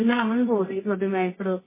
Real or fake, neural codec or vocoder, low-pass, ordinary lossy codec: fake; codec, 24 kHz, 0.9 kbps, WavTokenizer, medium music audio release; 3.6 kHz; MP3, 16 kbps